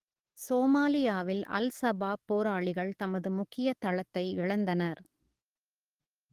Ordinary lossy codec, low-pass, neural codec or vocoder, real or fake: Opus, 24 kbps; 14.4 kHz; codec, 44.1 kHz, 7.8 kbps, DAC; fake